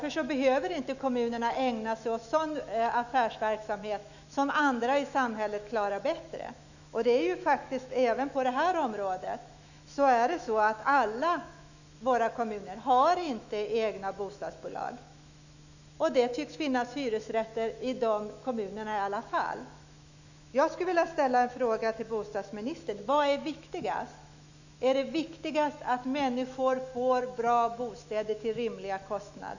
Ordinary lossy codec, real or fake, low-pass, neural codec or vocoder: none; fake; 7.2 kHz; autoencoder, 48 kHz, 128 numbers a frame, DAC-VAE, trained on Japanese speech